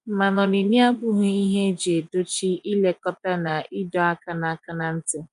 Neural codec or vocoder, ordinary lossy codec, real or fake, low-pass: vocoder, 24 kHz, 100 mel bands, Vocos; none; fake; 10.8 kHz